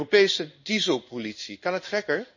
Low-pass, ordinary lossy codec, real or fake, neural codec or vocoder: 7.2 kHz; none; fake; codec, 16 kHz in and 24 kHz out, 1 kbps, XY-Tokenizer